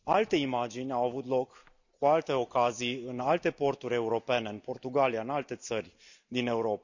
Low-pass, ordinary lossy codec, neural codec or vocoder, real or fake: 7.2 kHz; none; none; real